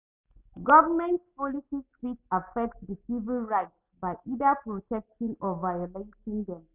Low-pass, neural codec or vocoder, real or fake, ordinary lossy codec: 3.6 kHz; none; real; none